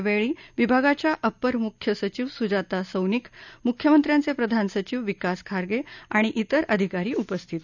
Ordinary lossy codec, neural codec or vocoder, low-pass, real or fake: none; none; 7.2 kHz; real